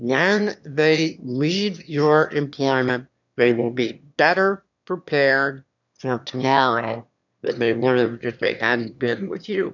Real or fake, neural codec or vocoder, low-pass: fake; autoencoder, 22.05 kHz, a latent of 192 numbers a frame, VITS, trained on one speaker; 7.2 kHz